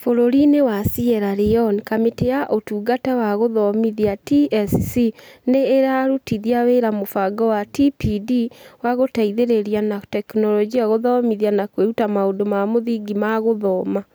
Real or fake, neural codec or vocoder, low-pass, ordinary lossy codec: real; none; none; none